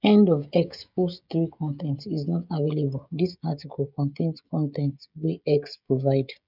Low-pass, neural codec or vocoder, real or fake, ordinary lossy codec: 5.4 kHz; codec, 16 kHz, 16 kbps, FreqCodec, smaller model; fake; none